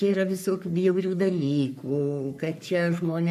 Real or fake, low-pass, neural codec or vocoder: fake; 14.4 kHz; codec, 44.1 kHz, 3.4 kbps, Pupu-Codec